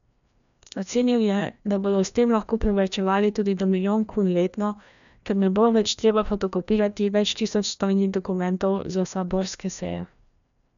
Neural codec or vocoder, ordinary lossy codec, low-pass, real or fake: codec, 16 kHz, 1 kbps, FreqCodec, larger model; none; 7.2 kHz; fake